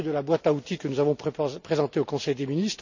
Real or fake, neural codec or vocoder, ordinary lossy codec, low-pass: real; none; none; 7.2 kHz